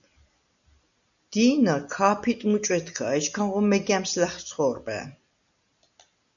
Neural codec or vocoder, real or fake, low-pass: none; real; 7.2 kHz